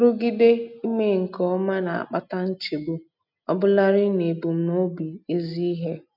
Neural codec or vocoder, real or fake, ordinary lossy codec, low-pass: none; real; none; 5.4 kHz